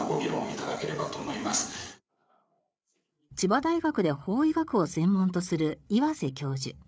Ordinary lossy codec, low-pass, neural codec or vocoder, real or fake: none; none; codec, 16 kHz, 8 kbps, FreqCodec, larger model; fake